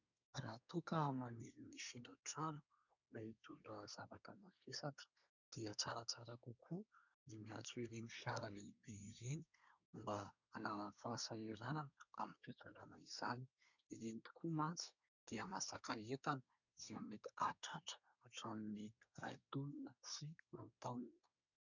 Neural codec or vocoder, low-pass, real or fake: codec, 24 kHz, 1 kbps, SNAC; 7.2 kHz; fake